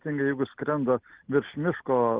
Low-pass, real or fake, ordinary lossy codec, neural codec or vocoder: 3.6 kHz; real; Opus, 16 kbps; none